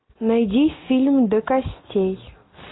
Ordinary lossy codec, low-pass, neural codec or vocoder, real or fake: AAC, 16 kbps; 7.2 kHz; none; real